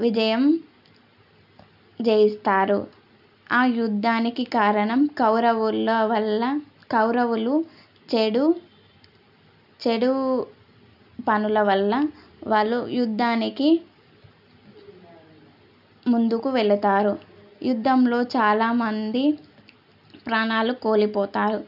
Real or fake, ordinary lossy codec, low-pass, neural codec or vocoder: real; none; 5.4 kHz; none